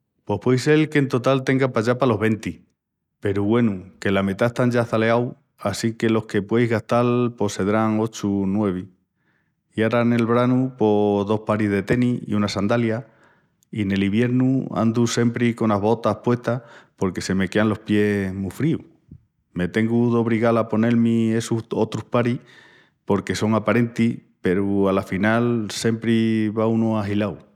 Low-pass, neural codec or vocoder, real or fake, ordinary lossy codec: 19.8 kHz; none; real; none